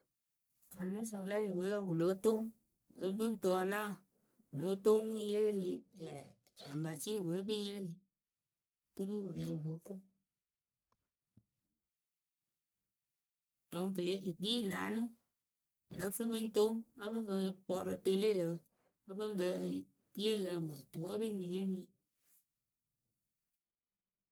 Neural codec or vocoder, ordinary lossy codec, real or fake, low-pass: codec, 44.1 kHz, 1.7 kbps, Pupu-Codec; none; fake; none